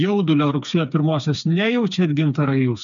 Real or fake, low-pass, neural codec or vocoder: fake; 7.2 kHz; codec, 16 kHz, 4 kbps, FreqCodec, smaller model